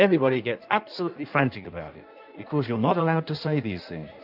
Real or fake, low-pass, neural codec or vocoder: fake; 5.4 kHz; codec, 16 kHz in and 24 kHz out, 1.1 kbps, FireRedTTS-2 codec